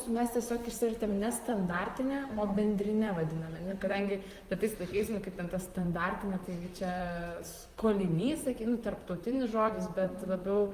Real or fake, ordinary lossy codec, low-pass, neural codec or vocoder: fake; Opus, 32 kbps; 14.4 kHz; vocoder, 44.1 kHz, 128 mel bands, Pupu-Vocoder